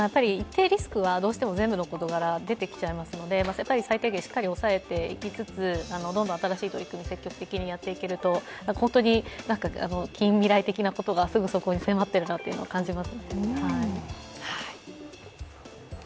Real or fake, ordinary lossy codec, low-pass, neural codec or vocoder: real; none; none; none